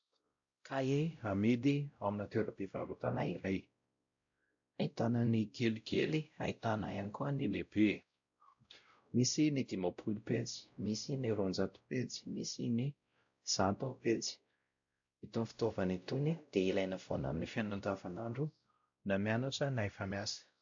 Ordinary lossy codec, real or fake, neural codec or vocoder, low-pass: AAC, 64 kbps; fake; codec, 16 kHz, 0.5 kbps, X-Codec, WavLM features, trained on Multilingual LibriSpeech; 7.2 kHz